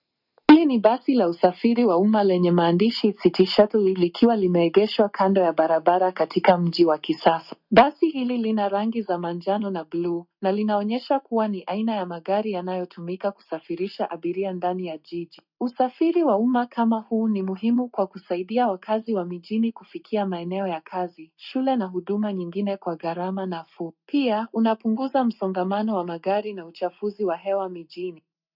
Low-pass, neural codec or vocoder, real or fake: 5.4 kHz; none; real